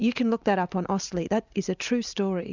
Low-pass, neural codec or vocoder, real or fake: 7.2 kHz; vocoder, 44.1 kHz, 128 mel bands every 256 samples, BigVGAN v2; fake